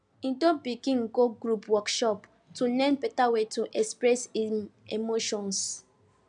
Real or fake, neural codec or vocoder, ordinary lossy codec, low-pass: real; none; none; 9.9 kHz